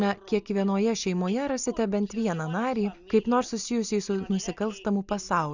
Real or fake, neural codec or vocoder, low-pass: real; none; 7.2 kHz